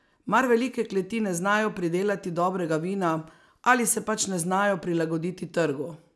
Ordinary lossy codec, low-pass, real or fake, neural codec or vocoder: none; none; real; none